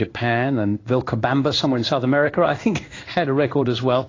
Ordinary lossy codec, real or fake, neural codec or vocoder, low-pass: AAC, 32 kbps; fake; codec, 16 kHz in and 24 kHz out, 1 kbps, XY-Tokenizer; 7.2 kHz